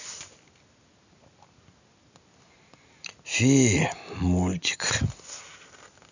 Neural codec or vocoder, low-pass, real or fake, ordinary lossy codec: none; 7.2 kHz; real; none